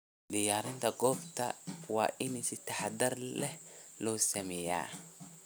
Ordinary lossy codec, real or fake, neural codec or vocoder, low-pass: none; fake; vocoder, 44.1 kHz, 128 mel bands every 256 samples, BigVGAN v2; none